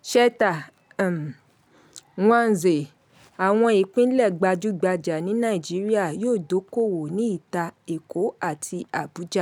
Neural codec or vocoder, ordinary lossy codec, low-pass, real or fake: none; none; 19.8 kHz; real